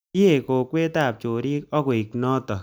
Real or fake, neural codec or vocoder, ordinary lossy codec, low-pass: real; none; none; none